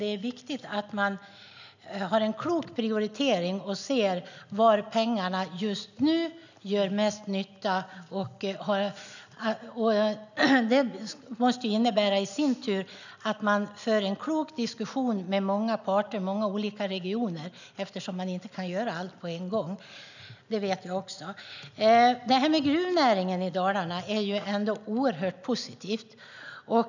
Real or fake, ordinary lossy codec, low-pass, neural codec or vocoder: real; none; 7.2 kHz; none